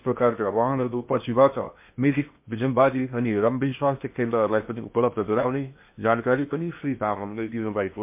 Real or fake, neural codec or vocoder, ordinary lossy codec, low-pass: fake; codec, 16 kHz in and 24 kHz out, 0.8 kbps, FocalCodec, streaming, 65536 codes; MP3, 32 kbps; 3.6 kHz